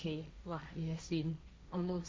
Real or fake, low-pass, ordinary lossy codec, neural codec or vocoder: fake; none; none; codec, 16 kHz, 1.1 kbps, Voila-Tokenizer